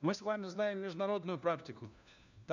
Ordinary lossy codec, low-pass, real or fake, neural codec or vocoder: none; 7.2 kHz; fake; codec, 16 kHz, 1 kbps, FunCodec, trained on LibriTTS, 50 frames a second